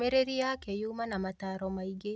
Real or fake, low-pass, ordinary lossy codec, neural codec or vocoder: real; none; none; none